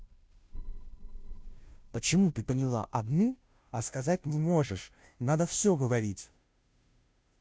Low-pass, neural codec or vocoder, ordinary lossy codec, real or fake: none; codec, 16 kHz, 0.5 kbps, FunCodec, trained on Chinese and English, 25 frames a second; none; fake